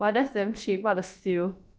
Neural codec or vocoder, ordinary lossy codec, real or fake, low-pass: codec, 16 kHz, about 1 kbps, DyCAST, with the encoder's durations; none; fake; none